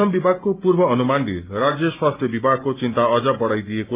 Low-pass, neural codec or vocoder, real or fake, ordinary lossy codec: 3.6 kHz; none; real; Opus, 24 kbps